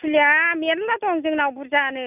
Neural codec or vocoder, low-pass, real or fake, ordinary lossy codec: none; 3.6 kHz; real; none